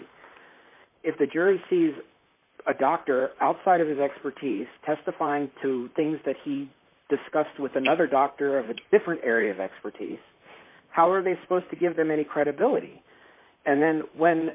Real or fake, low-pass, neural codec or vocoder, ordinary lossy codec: fake; 3.6 kHz; vocoder, 44.1 kHz, 128 mel bands, Pupu-Vocoder; MP3, 32 kbps